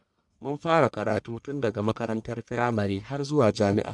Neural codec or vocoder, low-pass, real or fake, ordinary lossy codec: codec, 44.1 kHz, 1.7 kbps, Pupu-Codec; 10.8 kHz; fake; MP3, 96 kbps